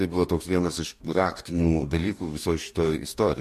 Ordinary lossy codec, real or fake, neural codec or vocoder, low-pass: MP3, 64 kbps; fake; codec, 44.1 kHz, 2.6 kbps, DAC; 14.4 kHz